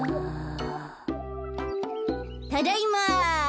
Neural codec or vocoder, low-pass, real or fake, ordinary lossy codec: none; none; real; none